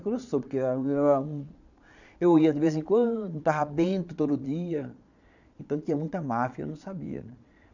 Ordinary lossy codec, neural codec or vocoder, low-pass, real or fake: none; vocoder, 22.05 kHz, 80 mel bands, Vocos; 7.2 kHz; fake